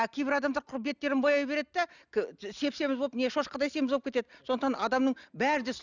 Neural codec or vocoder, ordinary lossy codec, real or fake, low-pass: none; none; real; 7.2 kHz